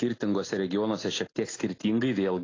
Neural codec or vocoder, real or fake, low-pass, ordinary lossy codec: none; real; 7.2 kHz; AAC, 32 kbps